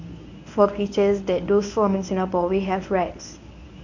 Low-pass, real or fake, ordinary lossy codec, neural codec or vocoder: 7.2 kHz; fake; none; codec, 24 kHz, 0.9 kbps, WavTokenizer, medium speech release version 1